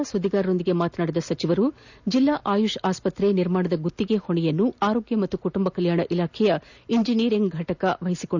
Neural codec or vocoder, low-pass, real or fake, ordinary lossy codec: none; 7.2 kHz; real; none